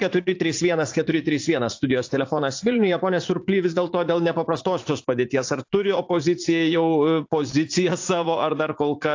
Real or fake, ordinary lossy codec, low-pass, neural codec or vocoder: fake; AAC, 48 kbps; 7.2 kHz; vocoder, 44.1 kHz, 80 mel bands, Vocos